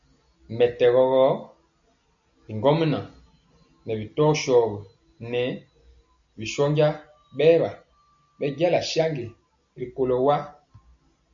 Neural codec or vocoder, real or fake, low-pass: none; real; 7.2 kHz